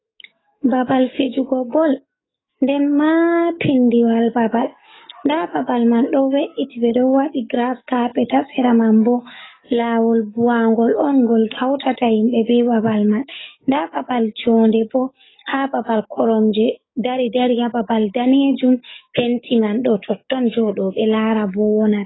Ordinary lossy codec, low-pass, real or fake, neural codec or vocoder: AAC, 16 kbps; 7.2 kHz; fake; codec, 44.1 kHz, 7.8 kbps, DAC